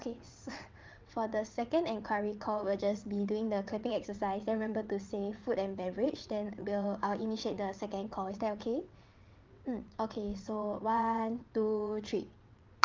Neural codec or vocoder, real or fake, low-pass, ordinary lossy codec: vocoder, 22.05 kHz, 80 mel bands, WaveNeXt; fake; 7.2 kHz; Opus, 32 kbps